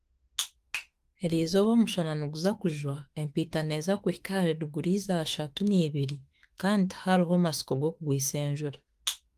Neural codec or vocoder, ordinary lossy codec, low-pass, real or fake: autoencoder, 48 kHz, 32 numbers a frame, DAC-VAE, trained on Japanese speech; Opus, 24 kbps; 14.4 kHz; fake